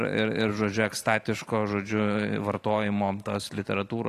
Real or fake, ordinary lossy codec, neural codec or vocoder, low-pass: fake; AAC, 48 kbps; vocoder, 44.1 kHz, 128 mel bands every 512 samples, BigVGAN v2; 14.4 kHz